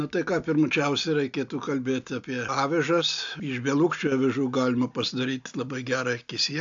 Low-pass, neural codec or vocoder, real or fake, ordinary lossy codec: 7.2 kHz; none; real; AAC, 64 kbps